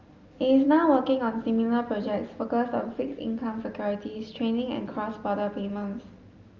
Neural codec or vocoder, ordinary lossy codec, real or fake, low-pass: none; Opus, 32 kbps; real; 7.2 kHz